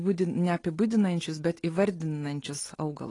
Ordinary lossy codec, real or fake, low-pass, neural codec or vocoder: AAC, 32 kbps; real; 10.8 kHz; none